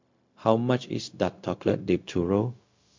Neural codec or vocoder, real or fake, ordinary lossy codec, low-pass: codec, 16 kHz, 0.4 kbps, LongCat-Audio-Codec; fake; MP3, 48 kbps; 7.2 kHz